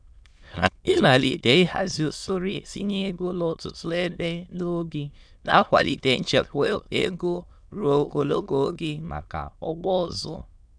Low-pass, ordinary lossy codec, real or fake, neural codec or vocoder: 9.9 kHz; none; fake; autoencoder, 22.05 kHz, a latent of 192 numbers a frame, VITS, trained on many speakers